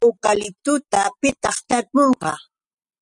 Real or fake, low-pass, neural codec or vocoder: real; 10.8 kHz; none